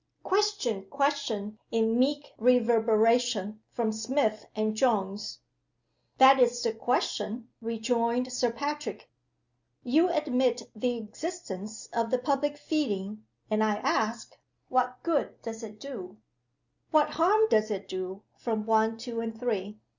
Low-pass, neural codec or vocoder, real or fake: 7.2 kHz; none; real